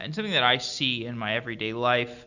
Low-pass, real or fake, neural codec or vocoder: 7.2 kHz; real; none